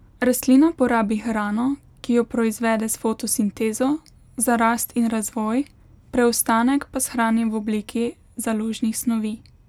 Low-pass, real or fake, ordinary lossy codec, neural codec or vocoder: 19.8 kHz; real; none; none